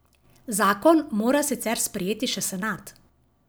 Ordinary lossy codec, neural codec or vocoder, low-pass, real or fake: none; none; none; real